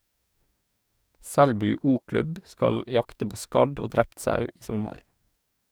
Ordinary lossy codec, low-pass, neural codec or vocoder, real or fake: none; none; codec, 44.1 kHz, 2.6 kbps, DAC; fake